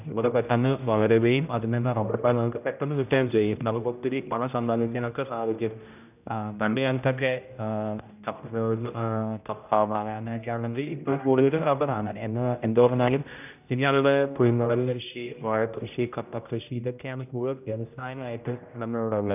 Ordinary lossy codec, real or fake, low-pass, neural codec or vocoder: none; fake; 3.6 kHz; codec, 16 kHz, 0.5 kbps, X-Codec, HuBERT features, trained on general audio